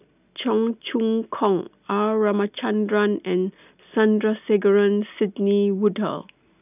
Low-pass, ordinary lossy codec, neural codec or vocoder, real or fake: 3.6 kHz; none; none; real